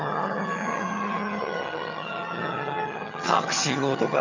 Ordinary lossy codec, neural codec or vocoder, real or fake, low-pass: none; vocoder, 22.05 kHz, 80 mel bands, HiFi-GAN; fake; 7.2 kHz